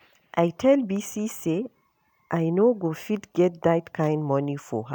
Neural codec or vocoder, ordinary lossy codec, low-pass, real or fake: none; none; none; real